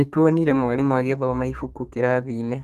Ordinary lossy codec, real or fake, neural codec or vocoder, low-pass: Opus, 32 kbps; fake; codec, 32 kHz, 1.9 kbps, SNAC; 14.4 kHz